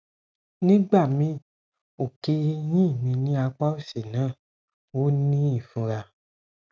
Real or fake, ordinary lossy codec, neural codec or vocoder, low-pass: real; none; none; none